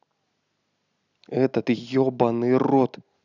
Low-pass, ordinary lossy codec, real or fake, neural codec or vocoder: 7.2 kHz; none; real; none